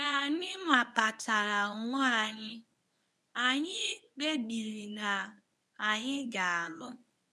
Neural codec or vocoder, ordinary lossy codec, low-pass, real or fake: codec, 24 kHz, 0.9 kbps, WavTokenizer, medium speech release version 1; none; none; fake